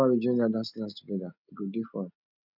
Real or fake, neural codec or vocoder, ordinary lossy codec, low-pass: real; none; none; 5.4 kHz